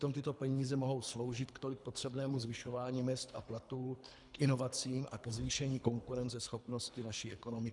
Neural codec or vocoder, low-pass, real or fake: codec, 24 kHz, 3 kbps, HILCodec; 10.8 kHz; fake